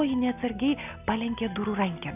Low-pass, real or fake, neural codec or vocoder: 3.6 kHz; real; none